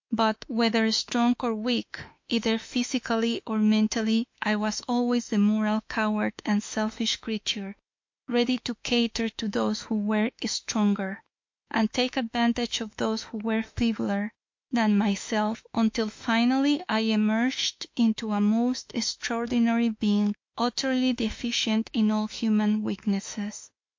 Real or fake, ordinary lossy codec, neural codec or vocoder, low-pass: fake; MP3, 48 kbps; autoencoder, 48 kHz, 32 numbers a frame, DAC-VAE, trained on Japanese speech; 7.2 kHz